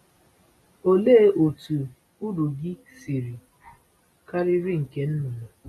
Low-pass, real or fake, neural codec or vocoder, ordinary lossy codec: 19.8 kHz; real; none; AAC, 32 kbps